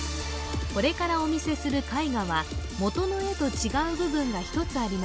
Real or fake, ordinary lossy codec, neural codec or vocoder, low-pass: real; none; none; none